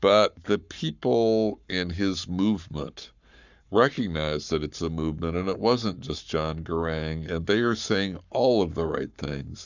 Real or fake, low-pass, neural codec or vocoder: fake; 7.2 kHz; codec, 44.1 kHz, 7.8 kbps, Pupu-Codec